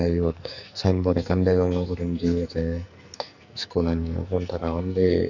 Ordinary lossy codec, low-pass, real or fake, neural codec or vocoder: none; 7.2 kHz; fake; codec, 44.1 kHz, 2.6 kbps, SNAC